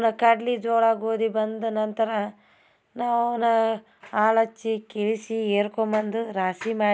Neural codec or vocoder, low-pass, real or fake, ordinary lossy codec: none; none; real; none